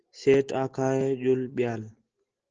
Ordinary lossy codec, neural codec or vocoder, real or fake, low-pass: Opus, 16 kbps; none; real; 7.2 kHz